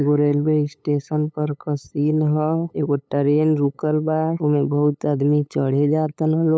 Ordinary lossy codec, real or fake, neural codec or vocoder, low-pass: none; fake; codec, 16 kHz, 8 kbps, FunCodec, trained on LibriTTS, 25 frames a second; none